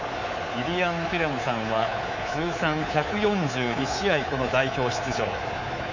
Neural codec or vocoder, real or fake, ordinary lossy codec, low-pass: codec, 24 kHz, 3.1 kbps, DualCodec; fake; none; 7.2 kHz